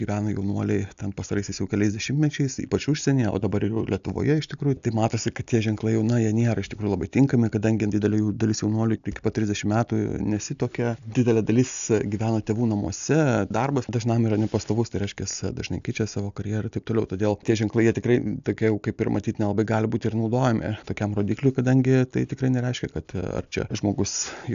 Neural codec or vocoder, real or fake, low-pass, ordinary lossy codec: none; real; 7.2 kHz; AAC, 96 kbps